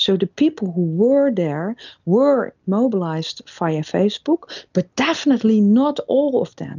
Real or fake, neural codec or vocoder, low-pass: real; none; 7.2 kHz